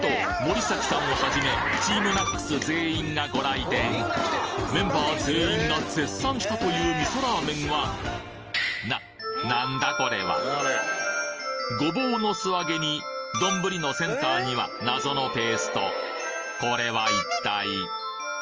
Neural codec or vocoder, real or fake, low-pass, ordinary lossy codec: none; real; 7.2 kHz; Opus, 24 kbps